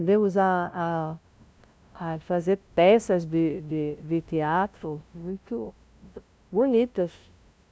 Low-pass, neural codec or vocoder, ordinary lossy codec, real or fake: none; codec, 16 kHz, 0.5 kbps, FunCodec, trained on LibriTTS, 25 frames a second; none; fake